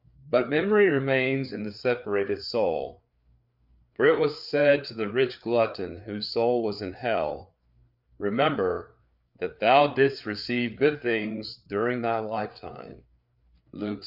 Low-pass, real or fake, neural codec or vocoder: 5.4 kHz; fake; codec, 16 kHz, 4 kbps, FreqCodec, larger model